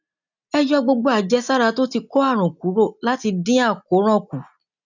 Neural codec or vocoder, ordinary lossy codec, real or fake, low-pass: none; none; real; 7.2 kHz